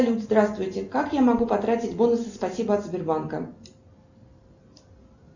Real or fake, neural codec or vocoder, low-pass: real; none; 7.2 kHz